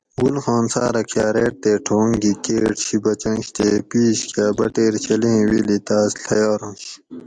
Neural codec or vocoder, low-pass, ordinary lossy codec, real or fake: none; 9.9 kHz; Opus, 64 kbps; real